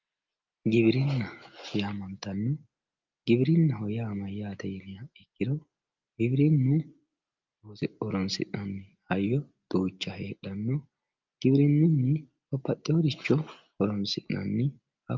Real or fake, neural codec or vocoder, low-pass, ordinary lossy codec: real; none; 7.2 kHz; Opus, 24 kbps